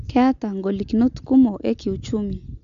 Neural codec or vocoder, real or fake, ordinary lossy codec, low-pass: none; real; MP3, 64 kbps; 7.2 kHz